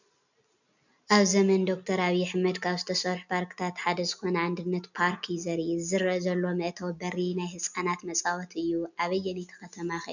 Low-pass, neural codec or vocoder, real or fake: 7.2 kHz; none; real